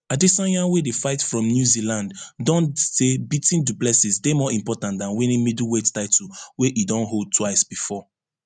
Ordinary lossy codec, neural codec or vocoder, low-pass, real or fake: none; none; 9.9 kHz; real